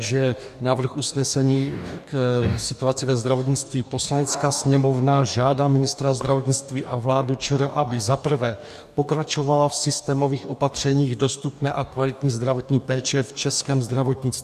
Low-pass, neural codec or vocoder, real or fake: 14.4 kHz; codec, 44.1 kHz, 2.6 kbps, DAC; fake